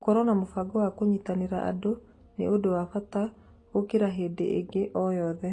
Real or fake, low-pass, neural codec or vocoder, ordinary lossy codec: real; 10.8 kHz; none; AAC, 32 kbps